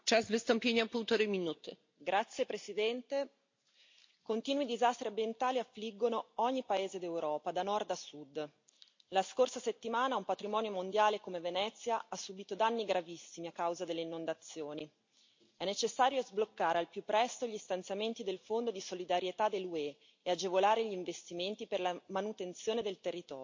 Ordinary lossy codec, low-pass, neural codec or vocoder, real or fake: MP3, 48 kbps; 7.2 kHz; none; real